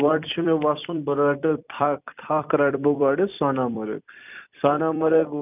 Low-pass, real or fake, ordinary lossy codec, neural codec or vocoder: 3.6 kHz; fake; none; vocoder, 44.1 kHz, 128 mel bands every 256 samples, BigVGAN v2